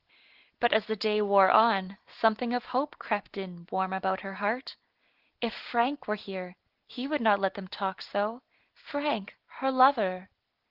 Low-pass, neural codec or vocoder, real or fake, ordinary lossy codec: 5.4 kHz; none; real; Opus, 16 kbps